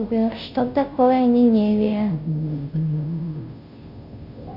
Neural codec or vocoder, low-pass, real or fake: codec, 16 kHz, 0.5 kbps, FunCodec, trained on Chinese and English, 25 frames a second; 5.4 kHz; fake